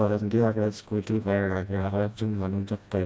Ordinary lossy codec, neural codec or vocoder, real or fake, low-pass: none; codec, 16 kHz, 1 kbps, FreqCodec, smaller model; fake; none